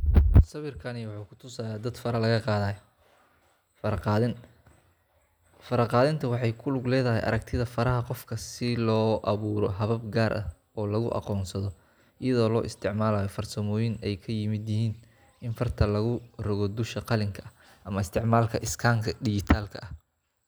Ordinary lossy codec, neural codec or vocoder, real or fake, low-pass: none; none; real; none